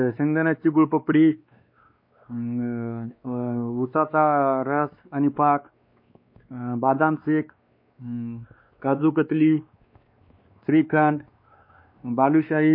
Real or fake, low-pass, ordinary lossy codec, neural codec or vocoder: fake; 5.4 kHz; MP3, 32 kbps; codec, 16 kHz, 2 kbps, X-Codec, WavLM features, trained on Multilingual LibriSpeech